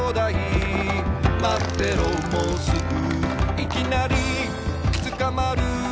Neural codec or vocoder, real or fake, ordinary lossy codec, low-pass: none; real; none; none